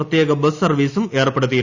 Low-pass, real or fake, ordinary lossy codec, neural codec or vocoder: 7.2 kHz; real; Opus, 64 kbps; none